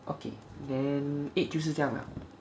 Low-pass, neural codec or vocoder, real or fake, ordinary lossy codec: none; none; real; none